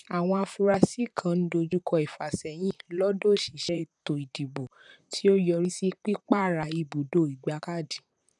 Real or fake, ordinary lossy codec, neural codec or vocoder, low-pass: fake; none; vocoder, 44.1 kHz, 128 mel bands, Pupu-Vocoder; 10.8 kHz